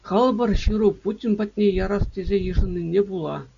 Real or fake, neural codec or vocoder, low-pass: real; none; 7.2 kHz